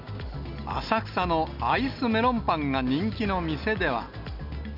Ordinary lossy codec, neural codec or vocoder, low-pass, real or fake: none; none; 5.4 kHz; real